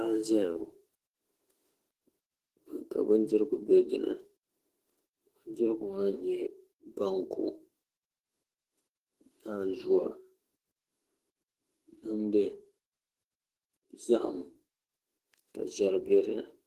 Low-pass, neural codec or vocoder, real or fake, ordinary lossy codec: 14.4 kHz; autoencoder, 48 kHz, 32 numbers a frame, DAC-VAE, trained on Japanese speech; fake; Opus, 16 kbps